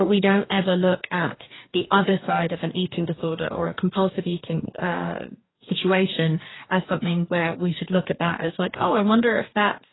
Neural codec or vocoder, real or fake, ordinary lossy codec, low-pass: codec, 44.1 kHz, 2.6 kbps, DAC; fake; AAC, 16 kbps; 7.2 kHz